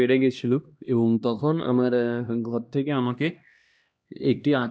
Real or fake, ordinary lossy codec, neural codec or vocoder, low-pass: fake; none; codec, 16 kHz, 1 kbps, X-Codec, HuBERT features, trained on LibriSpeech; none